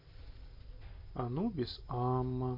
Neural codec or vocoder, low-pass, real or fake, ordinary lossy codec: none; 5.4 kHz; real; MP3, 32 kbps